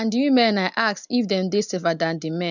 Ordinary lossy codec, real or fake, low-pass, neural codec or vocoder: none; real; 7.2 kHz; none